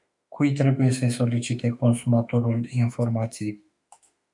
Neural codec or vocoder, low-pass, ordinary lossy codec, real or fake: autoencoder, 48 kHz, 32 numbers a frame, DAC-VAE, trained on Japanese speech; 10.8 kHz; MP3, 96 kbps; fake